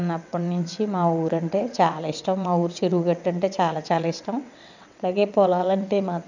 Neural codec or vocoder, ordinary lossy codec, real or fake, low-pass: vocoder, 22.05 kHz, 80 mel bands, WaveNeXt; none; fake; 7.2 kHz